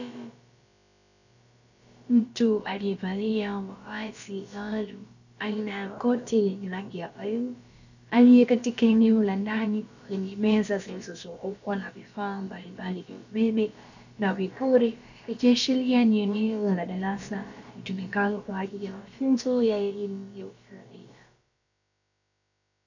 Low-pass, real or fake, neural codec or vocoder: 7.2 kHz; fake; codec, 16 kHz, about 1 kbps, DyCAST, with the encoder's durations